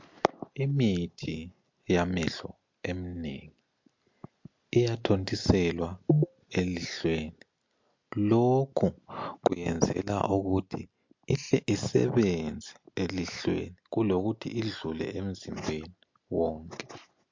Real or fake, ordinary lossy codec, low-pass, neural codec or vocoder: real; MP3, 48 kbps; 7.2 kHz; none